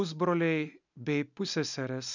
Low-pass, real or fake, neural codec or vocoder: 7.2 kHz; real; none